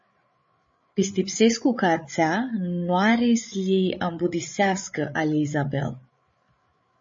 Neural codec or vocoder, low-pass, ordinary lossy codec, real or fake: codec, 16 kHz, 8 kbps, FreqCodec, larger model; 7.2 kHz; MP3, 32 kbps; fake